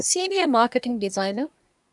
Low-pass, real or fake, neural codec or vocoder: 10.8 kHz; fake; codec, 24 kHz, 1 kbps, SNAC